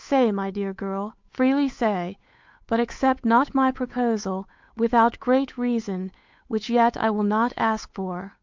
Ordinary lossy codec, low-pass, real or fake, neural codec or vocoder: MP3, 64 kbps; 7.2 kHz; fake; codec, 16 kHz, 8 kbps, FunCodec, trained on Chinese and English, 25 frames a second